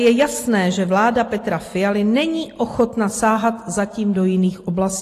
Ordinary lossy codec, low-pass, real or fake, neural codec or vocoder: AAC, 48 kbps; 14.4 kHz; real; none